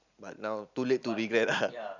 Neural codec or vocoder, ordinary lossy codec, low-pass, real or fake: none; none; 7.2 kHz; real